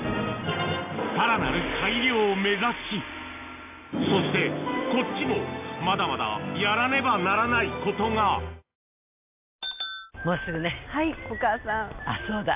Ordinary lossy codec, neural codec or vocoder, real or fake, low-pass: AAC, 32 kbps; none; real; 3.6 kHz